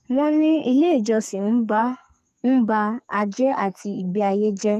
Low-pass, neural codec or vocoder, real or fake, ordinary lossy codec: 14.4 kHz; codec, 44.1 kHz, 2.6 kbps, SNAC; fake; none